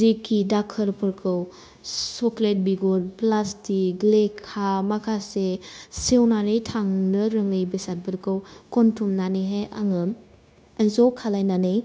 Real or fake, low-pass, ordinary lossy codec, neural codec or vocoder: fake; none; none; codec, 16 kHz, 0.9 kbps, LongCat-Audio-Codec